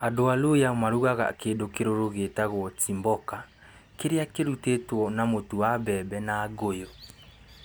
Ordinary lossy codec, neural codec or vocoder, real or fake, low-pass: none; none; real; none